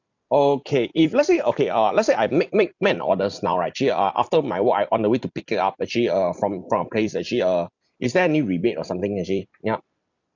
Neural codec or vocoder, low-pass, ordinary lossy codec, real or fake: vocoder, 44.1 kHz, 128 mel bands every 256 samples, BigVGAN v2; 7.2 kHz; none; fake